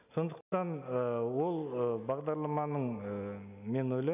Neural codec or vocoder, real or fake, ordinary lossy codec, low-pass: none; real; none; 3.6 kHz